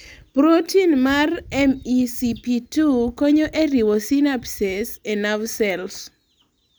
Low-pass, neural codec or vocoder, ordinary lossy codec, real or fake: none; none; none; real